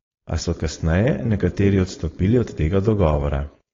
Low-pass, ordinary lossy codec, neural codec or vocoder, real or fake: 7.2 kHz; AAC, 32 kbps; codec, 16 kHz, 4.8 kbps, FACodec; fake